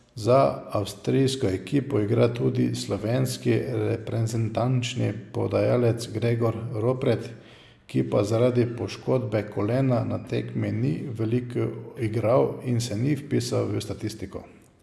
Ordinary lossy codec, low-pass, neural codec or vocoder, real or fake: none; none; none; real